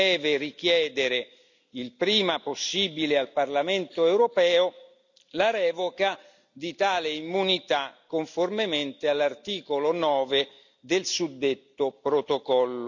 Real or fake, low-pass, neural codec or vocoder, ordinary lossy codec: real; 7.2 kHz; none; none